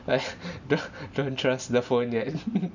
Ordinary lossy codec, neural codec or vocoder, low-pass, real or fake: none; none; 7.2 kHz; real